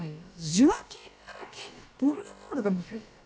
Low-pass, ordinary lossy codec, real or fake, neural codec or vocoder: none; none; fake; codec, 16 kHz, about 1 kbps, DyCAST, with the encoder's durations